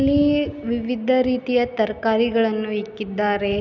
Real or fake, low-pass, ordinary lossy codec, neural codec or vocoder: real; 7.2 kHz; none; none